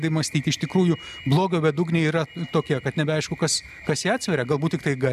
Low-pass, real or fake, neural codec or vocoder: 14.4 kHz; real; none